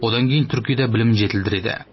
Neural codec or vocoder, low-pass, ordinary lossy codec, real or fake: none; 7.2 kHz; MP3, 24 kbps; real